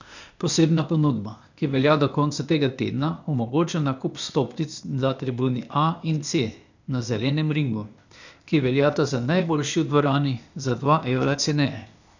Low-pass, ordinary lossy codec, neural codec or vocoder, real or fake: 7.2 kHz; none; codec, 16 kHz, 0.8 kbps, ZipCodec; fake